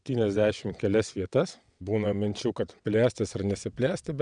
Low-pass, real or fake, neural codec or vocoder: 9.9 kHz; fake; vocoder, 22.05 kHz, 80 mel bands, WaveNeXt